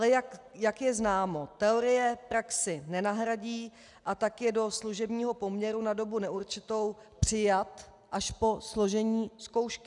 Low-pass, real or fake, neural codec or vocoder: 10.8 kHz; real; none